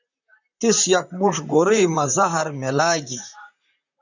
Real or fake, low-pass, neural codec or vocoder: fake; 7.2 kHz; vocoder, 44.1 kHz, 128 mel bands, Pupu-Vocoder